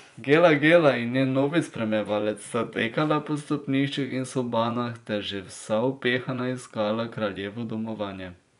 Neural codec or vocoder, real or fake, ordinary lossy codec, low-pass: vocoder, 24 kHz, 100 mel bands, Vocos; fake; none; 10.8 kHz